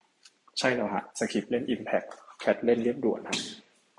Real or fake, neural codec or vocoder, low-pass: real; none; 10.8 kHz